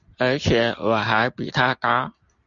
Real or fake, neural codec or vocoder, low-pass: real; none; 7.2 kHz